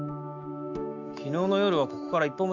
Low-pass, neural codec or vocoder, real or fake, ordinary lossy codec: 7.2 kHz; autoencoder, 48 kHz, 128 numbers a frame, DAC-VAE, trained on Japanese speech; fake; none